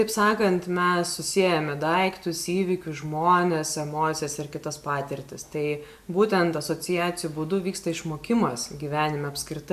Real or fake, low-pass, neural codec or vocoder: real; 14.4 kHz; none